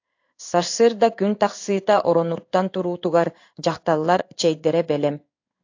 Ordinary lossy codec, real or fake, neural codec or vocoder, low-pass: AAC, 48 kbps; fake; codec, 16 kHz in and 24 kHz out, 1 kbps, XY-Tokenizer; 7.2 kHz